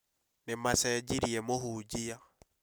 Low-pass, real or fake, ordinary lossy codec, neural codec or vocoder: none; real; none; none